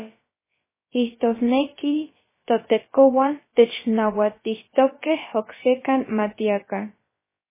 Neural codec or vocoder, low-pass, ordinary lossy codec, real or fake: codec, 16 kHz, about 1 kbps, DyCAST, with the encoder's durations; 3.6 kHz; MP3, 16 kbps; fake